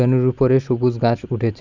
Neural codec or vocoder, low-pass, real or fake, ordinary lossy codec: none; 7.2 kHz; real; none